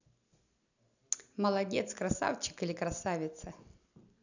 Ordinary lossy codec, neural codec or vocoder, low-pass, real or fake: none; none; 7.2 kHz; real